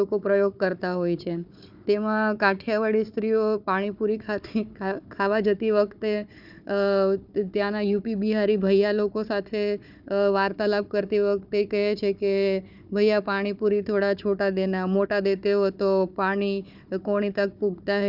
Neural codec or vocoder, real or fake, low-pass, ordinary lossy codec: codec, 16 kHz, 4 kbps, FunCodec, trained on Chinese and English, 50 frames a second; fake; 5.4 kHz; none